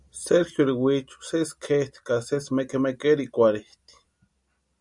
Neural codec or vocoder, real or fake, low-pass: none; real; 10.8 kHz